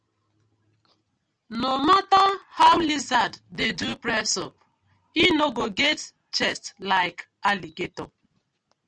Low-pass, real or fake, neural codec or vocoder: 9.9 kHz; real; none